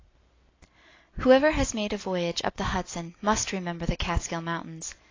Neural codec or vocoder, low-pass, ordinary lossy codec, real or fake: none; 7.2 kHz; AAC, 32 kbps; real